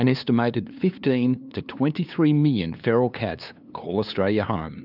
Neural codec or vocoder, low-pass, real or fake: codec, 16 kHz, 2 kbps, FunCodec, trained on LibriTTS, 25 frames a second; 5.4 kHz; fake